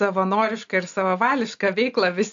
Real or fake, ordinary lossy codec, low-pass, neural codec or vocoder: real; AAC, 64 kbps; 7.2 kHz; none